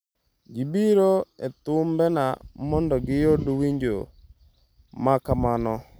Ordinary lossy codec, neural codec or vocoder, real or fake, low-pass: none; none; real; none